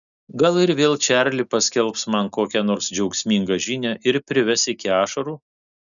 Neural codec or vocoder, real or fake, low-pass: none; real; 7.2 kHz